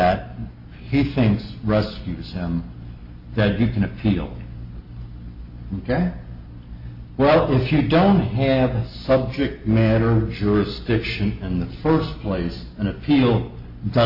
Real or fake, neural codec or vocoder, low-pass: real; none; 5.4 kHz